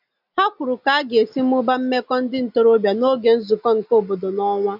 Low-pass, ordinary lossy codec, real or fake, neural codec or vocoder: 5.4 kHz; none; real; none